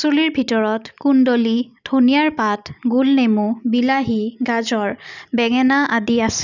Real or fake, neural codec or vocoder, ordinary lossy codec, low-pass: real; none; none; 7.2 kHz